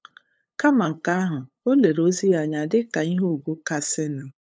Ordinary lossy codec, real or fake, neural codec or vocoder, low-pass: none; fake; codec, 16 kHz, 8 kbps, FunCodec, trained on LibriTTS, 25 frames a second; none